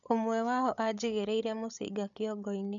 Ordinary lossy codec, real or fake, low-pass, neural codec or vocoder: none; fake; 7.2 kHz; codec, 16 kHz, 16 kbps, FreqCodec, larger model